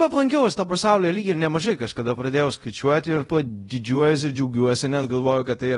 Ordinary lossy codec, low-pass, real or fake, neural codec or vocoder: AAC, 32 kbps; 10.8 kHz; fake; codec, 24 kHz, 0.5 kbps, DualCodec